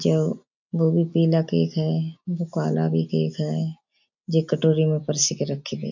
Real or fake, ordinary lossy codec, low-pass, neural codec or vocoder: real; none; 7.2 kHz; none